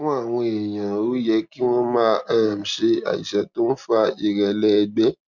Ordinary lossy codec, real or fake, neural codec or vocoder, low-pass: MP3, 64 kbps; real; none; 7.2 kHz